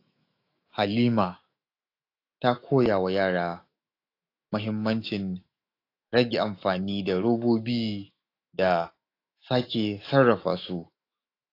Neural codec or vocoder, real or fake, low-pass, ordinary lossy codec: autoencoder, 48 kHz, 128 numbers a frame, DAC-VAE, trained on Japanese speech; fake; 5.4 kHz; AAC, 32 kbps